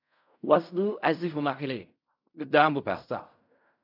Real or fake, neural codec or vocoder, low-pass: fake; codec, 16 kHz in and 24 kHz out, 0.4 kbps, LongCat-Audio-Codec, fine tuned four codebook decoder; 5.4 kHz